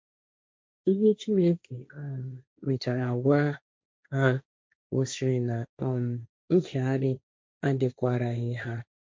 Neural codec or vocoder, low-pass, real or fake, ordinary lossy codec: codec, 16 kHz, 1.1 kbps, Voila-Tokenizer; none; fake; none